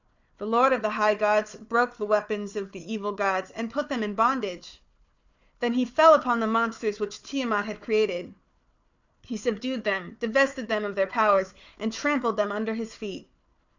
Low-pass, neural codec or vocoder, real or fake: 7.2 kHz; codec, 16 kHz, 4 kbps, FunCodec, trained on Chinese and English, 50 frames a second; fake